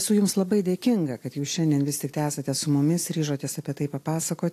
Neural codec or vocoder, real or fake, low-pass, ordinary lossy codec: none; real; 14.4 kHz; AAC, 64 kbps